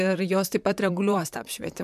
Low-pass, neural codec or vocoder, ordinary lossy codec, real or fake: 14.4 kHz; vocoder, 44.1 kHz, 128 mel bands, Pupu-Vocoder; MP3, 96 kbps; fake